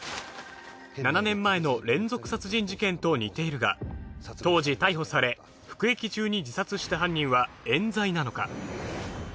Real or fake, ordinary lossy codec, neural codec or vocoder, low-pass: real; none; none; none